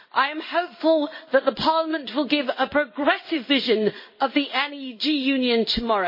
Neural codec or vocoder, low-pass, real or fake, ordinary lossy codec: codec, 16 kHz in and 24 kHz out, 1 kbps, XY-Tokenizer; 5.4 kHz; fake; MP3, 24 kbps